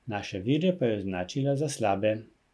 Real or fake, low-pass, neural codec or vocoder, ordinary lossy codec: real; none; none; none